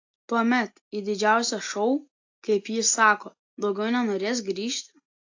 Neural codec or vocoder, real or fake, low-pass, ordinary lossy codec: none; real; 7.2 kHz; AAC, 48 kbps